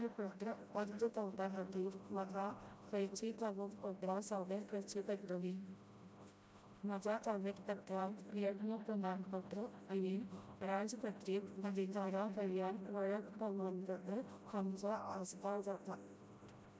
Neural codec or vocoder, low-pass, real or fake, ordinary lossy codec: codec, 16 kHz, 0.5 kbps, FreqCodec, smaller model; none; fake; none